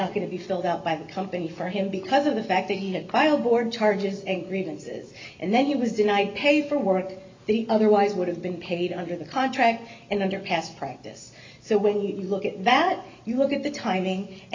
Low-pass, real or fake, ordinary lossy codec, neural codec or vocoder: 7.2 kHz; real; MP3, 64 kbps; none